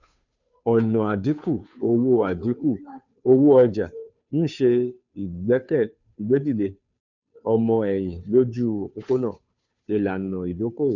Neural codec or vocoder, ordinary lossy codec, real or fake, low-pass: codec, 16 kHz, 2 kbps, FunCodec, trained on Chinese and English, 25 frames a second; none; fake; 7.2 kHz